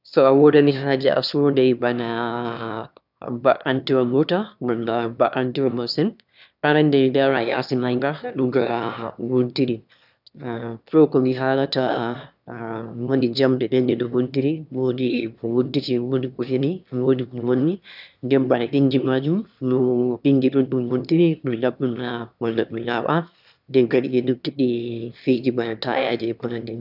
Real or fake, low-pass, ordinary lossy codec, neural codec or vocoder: fake; 5.4 kHz; none; autoencoder, 22.05 kHz, a latent of 192 numbers a frame, VITS, trained on one speaker